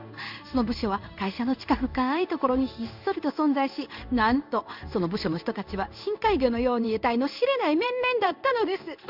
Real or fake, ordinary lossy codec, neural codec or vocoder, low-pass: fake; none; codec, 16 kHz in and 24 kHz out, 1 kbps, XY-Tokenizer; 5.4 kHz